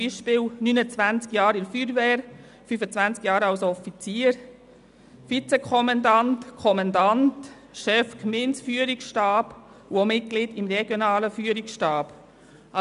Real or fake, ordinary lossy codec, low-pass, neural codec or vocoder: real; none; 10.8 kHz; none